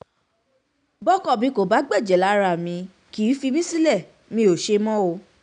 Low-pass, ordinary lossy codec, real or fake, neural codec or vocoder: 9.9 kHz; none; real; none